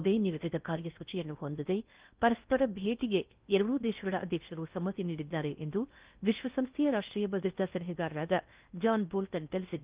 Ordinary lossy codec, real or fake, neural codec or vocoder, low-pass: Opus, 32 kbps; fake; codec, 16 kHz in and 24 kHz out, 0.6 kbps, FocalCodec, streaming, 4096 codes; 3.6 kHz